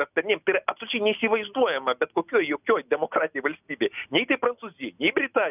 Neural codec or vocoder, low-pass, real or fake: none; 3.6 kHz; real